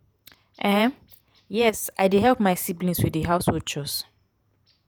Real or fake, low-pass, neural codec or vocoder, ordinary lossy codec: fake; none; vocoder, 48 kHz, 128 mel bands, Vocos; none